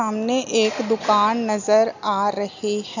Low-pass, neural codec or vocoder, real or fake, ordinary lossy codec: 7.2 kHz; none; real; none